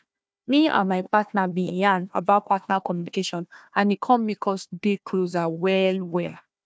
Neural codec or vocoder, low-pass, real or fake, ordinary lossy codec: codec, 16 kHz, 1 kbps, FunCodec, trained on Chinese and English, 50 frames a second; none; fake; none